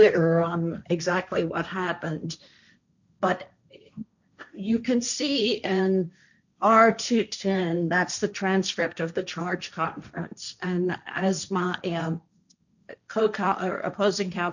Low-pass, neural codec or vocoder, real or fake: 7.2 kHz; codec, 16 kHz, 1.1 kbps, Voila-Tokenizer; fake